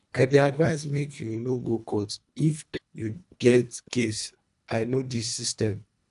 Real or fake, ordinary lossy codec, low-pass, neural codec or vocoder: fake; none; 10.8 kHz; codec, 24 kHz, 1.5 kbps, HILCodec